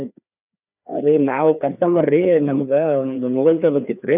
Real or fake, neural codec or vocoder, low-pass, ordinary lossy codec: fake; codec, 16 kHz, 2 kbps, FreqCodec, larger model; 3.6 kHz; none